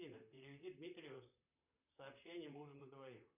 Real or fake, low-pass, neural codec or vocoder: fake; 3.6 kHz; vocoder, 44.1 kHz, 128 mel bands, Pupu-Vocoder